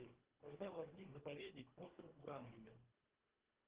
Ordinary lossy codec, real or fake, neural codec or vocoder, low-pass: Opus, 16 kbps; fake; codec, 24 kHz, 1.5 kbps, HILCodec; 3.6 kHz